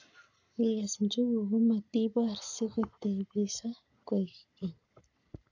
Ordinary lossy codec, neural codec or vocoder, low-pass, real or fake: none; codec, 44.1 kHz, 7.8 kbps, Pupu-Codec; 7.2 kHz; fake